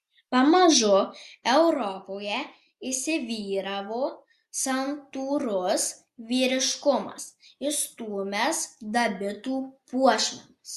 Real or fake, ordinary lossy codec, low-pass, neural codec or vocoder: real; Opus, 64 kbps; 14.4 kHz; none